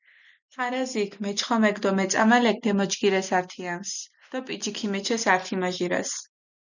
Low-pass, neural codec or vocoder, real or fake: 7.2 kHz; none; real